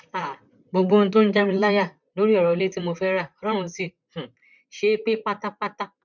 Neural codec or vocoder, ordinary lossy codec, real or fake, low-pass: vocoder, 44.1 kHz, 128 mel bands, Pupu-Vocoder; none; fake; 7.2 kHz